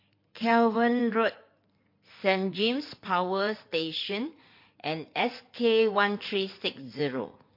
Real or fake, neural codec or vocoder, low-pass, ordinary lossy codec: fake; codec, 16 kHz in and 24 kHz out, 2.2 kbps, FireRedTTS-2 codec; 5.4 kHz; MP3, 32 kbps